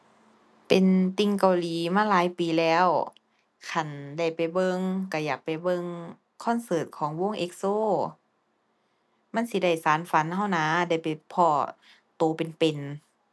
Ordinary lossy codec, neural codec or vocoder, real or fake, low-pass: none; none; real; none